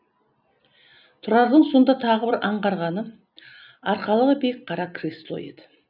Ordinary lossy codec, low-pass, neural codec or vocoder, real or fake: none; 5.4 kHz; none; real